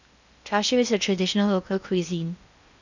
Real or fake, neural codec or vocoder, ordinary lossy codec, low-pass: fake; codec, 16 kHz in and 24 kHz out, 0.8 kbps, FocalCodec, streaming, 65536 codes; none; 7.2 kHz